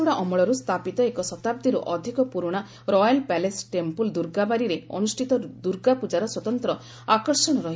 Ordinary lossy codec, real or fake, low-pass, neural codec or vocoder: none; real; none; none